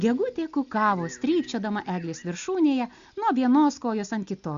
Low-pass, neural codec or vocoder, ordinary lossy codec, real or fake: 7.2 kHz; none; Opus, 64 kbps; real